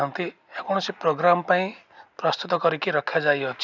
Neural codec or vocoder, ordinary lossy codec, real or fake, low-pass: none; none; real; 7.2 kHz